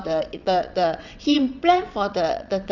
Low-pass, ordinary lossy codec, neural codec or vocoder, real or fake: 7.2 kHz; none; vocoder, 22.05 kHz, 80 mel bands, WaveNeXt; fake